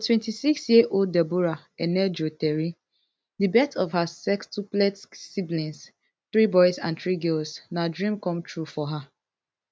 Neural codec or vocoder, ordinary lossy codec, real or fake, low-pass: none; none; real; none